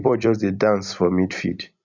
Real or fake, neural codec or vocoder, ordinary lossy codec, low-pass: fake; vocoder, 44.1 kHz, 128 mel bands every 256 samples, BigVGAN v2; none; 7.2 kHz